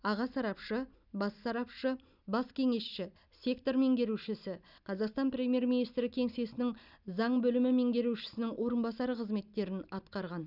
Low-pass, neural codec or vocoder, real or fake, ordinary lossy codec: 5.4 kHz; none; real; none